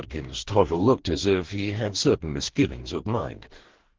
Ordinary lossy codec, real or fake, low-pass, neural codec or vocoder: Opus, 16 kbps; fake; 7.2 kHz; codec, 44.1 kHz, 2.6 kbps, DAC